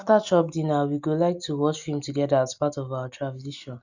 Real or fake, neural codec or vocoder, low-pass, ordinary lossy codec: real; none; 7.2 kHz; none